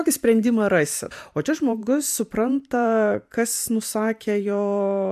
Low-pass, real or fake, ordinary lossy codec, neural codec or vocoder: 14.4 kHz; fake; AAC, 96 kbps; vocoder, 44.1 kHz, 128 mel bands every 512 samples, BigVGAN v2